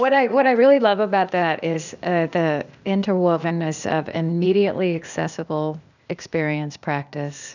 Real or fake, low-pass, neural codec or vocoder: fake; 7.2 kHz; codec, 16 kHz, 0.8 kbps, ZipCodec